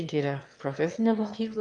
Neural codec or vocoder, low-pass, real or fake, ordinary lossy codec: autoencoder, 22.05 kHz, a latent of 192 numbers a frame, VITS, trained on one speaker; 9.9 kHz; fake; Opus, 32 kbps